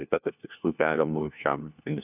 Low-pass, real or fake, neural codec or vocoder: 3.6 kHz; fake; codec, 16 kHz, 1 kbps, FunCodec, trained on LibriTTS, 50 frames a second